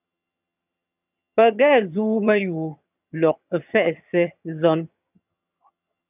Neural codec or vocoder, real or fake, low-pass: vocoder, 22.05 kHz, 80 mel bands, HiFi-GAN; fake; 3.6 kHz